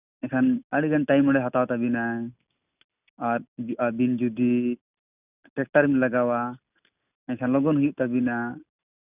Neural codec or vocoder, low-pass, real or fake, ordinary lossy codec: none; 3.6 kHz; real; none